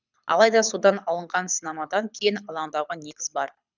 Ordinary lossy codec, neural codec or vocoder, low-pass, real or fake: none; codec, 24 kHz, 6 kbps, HILCodec; 7.2 kHz; fake